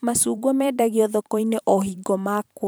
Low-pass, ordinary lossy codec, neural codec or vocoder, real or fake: none; none; vocoder, 44.1 kHz, 128 mel bands every 512 samples, BigVGAN v2; fake